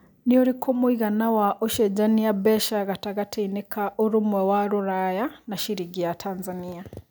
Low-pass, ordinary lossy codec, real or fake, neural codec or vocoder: none; none; real; none